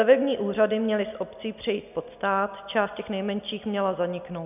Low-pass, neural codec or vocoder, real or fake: 3.6 kHz; none; real